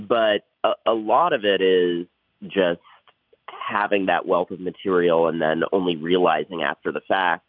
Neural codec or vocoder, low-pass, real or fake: none; 5.4 kHz; real